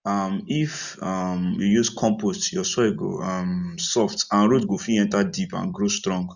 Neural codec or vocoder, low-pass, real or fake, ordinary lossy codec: none; 7.2 kHz; real; none